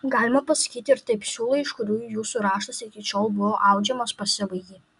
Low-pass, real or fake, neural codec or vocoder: 10.8 kHz; real; none